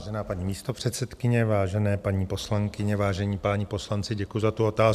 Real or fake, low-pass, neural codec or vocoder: real; 14.4 kHz; none